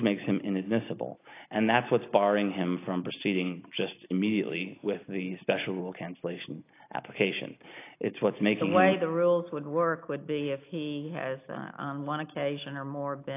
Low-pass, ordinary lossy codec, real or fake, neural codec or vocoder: 3.6 kHz; AAC, 24 kbps; real; none